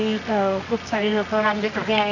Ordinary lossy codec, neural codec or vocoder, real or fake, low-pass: none; codec, 24 kHz, 0.9 kbps, WavTokenizer, medium music audio release; fake; 7.2 kHz